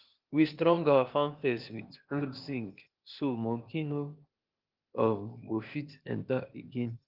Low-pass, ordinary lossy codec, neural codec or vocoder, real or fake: 5.4 kHz; Opus, 32 kbps; codec, 16 kHz, 0.8 kbps, ZipCodec; fake